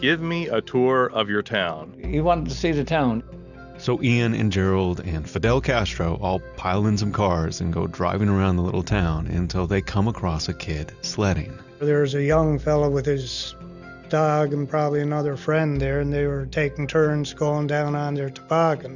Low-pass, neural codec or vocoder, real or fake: 7.2 kHz; none; real